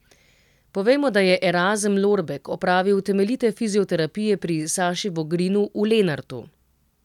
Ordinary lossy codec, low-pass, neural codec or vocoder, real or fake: none; 19.8 kHz; none; real